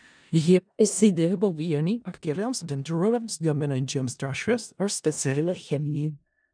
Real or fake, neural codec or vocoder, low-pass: fake; codec, 16 kHz in and 24 kHz out, 0.4 kbps, LongCat-Audio-Codec, four codebook decoder; 9.9 kHz